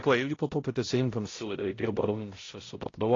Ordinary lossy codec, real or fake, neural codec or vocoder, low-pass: AAC, 32 kbps; fake; codec, 16 kHz, 0.5 kbps, X-Codec, HuBERT features, trained on balanced general audio; 7.2 kHz